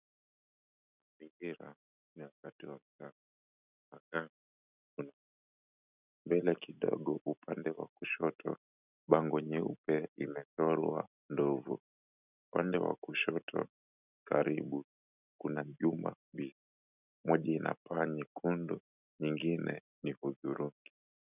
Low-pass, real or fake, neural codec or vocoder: 3.6 kHz; real; none